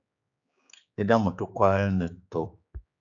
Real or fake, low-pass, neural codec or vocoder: fake; 7.2 kHz; codec, 16 kHz, 4 kbps, X-Codec, HuBERT features, trained on general audio